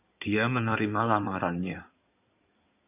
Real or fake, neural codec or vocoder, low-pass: fake; codec, 16 kHz in and 24 kHz out, 2.2 kbps, FireRedTTS-2 codec; 3.6 kHz